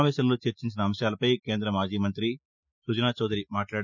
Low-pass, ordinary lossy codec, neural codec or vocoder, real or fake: 7.2 kHz; none; none; real